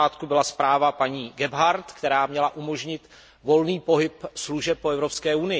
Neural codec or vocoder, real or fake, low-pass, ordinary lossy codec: none; real; none; none